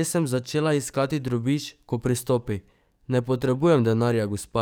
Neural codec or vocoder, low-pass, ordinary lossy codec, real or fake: codec, 44.1 kHz, 7.8 kbps, DAC; none; none; fake